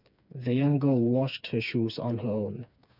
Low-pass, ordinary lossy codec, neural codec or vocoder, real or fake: 5.4 kHz; none; codec, 16 kHz, 4 kbps, FreqCodec, smaller model; fake